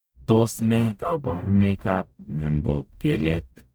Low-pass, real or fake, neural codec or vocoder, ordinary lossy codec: none; fake; codec, 44.1 kHz, 0.9 kbps, DAC; none